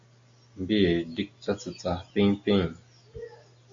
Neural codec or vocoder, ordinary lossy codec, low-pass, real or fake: none; MP3, 48 kbps; 7.2 kHz; real